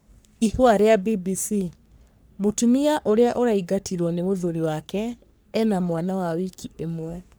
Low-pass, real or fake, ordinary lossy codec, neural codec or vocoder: none; fake; none; codec, 44.1 kHz, 3.4 kbps, Pupu-Codec